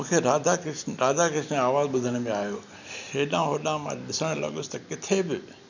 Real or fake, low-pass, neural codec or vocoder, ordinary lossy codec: real; 7.2 kHz; none; none